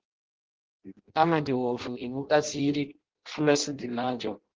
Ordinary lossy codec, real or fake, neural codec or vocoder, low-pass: Opus, 16 kbps; fake; codec, 16 kHz in and 24 kHz out, 0.6 kbps, FireRedTTS-2 codec; 7.2 kHz